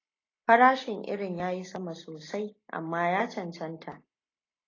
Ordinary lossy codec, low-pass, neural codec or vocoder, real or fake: AAC, 32 kbps; 7.2 kHz; none; real